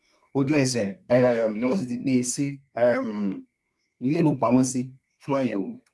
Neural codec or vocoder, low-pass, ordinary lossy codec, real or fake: codec, 24 kHz, 1 kbps, SNAC; none; none; fake